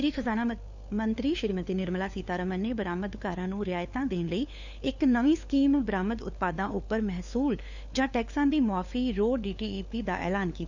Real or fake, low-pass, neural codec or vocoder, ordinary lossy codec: fake; 7.2 kHz; codec, 16 kHz, 4 kbps, FunCodec, trained on LibriTTS, 50 frames a second; none